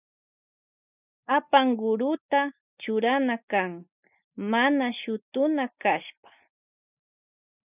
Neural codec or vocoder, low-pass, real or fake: none; 3.6 kHz; real